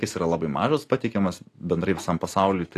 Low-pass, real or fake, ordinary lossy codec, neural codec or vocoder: 14.4 kHz; real; AAC, 48 kbps; none